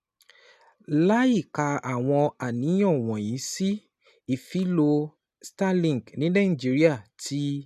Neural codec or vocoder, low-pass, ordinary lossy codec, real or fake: none; 14.4 kHz; none; real